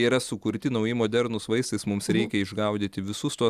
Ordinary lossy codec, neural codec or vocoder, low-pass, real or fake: Opus, 64 kbps; none; 14.4 kHz; real